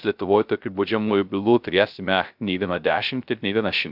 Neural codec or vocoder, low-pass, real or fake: codec, 16 kHz, 0.3 kbps, FocalCodec; 5.4 kHz; fake